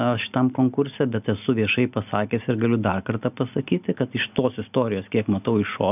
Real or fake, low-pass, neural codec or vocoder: real; 3.6 kHz; none